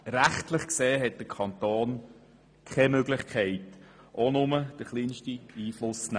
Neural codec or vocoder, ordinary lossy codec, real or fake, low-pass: none; none; real; 9.9 kHz